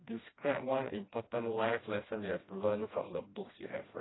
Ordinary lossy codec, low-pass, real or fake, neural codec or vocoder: AAC, 16 kbps; 7.2 kHz; fake; codec, 16 kHz, 1 kbps, FreqCodec, smaller model